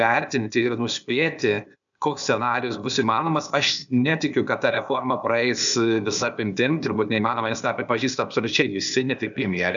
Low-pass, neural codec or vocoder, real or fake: 7.2 kHz; codec, 16 kHz, 0.8 kbps, ZipCodec; fake